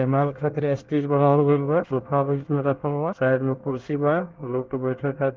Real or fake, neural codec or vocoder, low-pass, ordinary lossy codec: fake; codec, 24 kHz, 1 kbps, SNAC; 7.2 kHz; Opus, 16 kbps